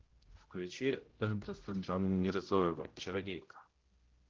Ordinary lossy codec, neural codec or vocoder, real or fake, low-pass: Opus, 16 kbps; codec, 16 kHz, 0.5 kbps, X-Codec, HuBERT features, trained on general audio; fake; 7.2 kHz